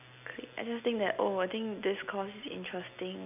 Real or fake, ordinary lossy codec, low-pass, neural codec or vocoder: real; none; 3.6 kHz; none